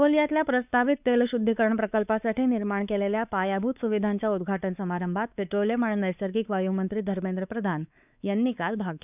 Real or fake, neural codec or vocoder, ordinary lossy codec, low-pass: fake; codec, 16 kHz, 4 kbps, X-Codec, WavLM features, trained on Multilingual LibriSpeech; none; 3.6 kHz